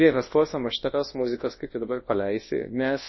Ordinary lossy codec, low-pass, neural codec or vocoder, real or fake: MP3, 24 kbps; 7.2 kHz; codec, 24 kHz, 0.9 kbps, WavTokenizer, large speech release; fake